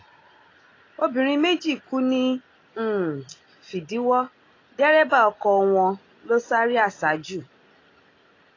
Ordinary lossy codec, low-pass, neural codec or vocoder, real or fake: AAC, 32 kbps; 7.2 kHz; none; real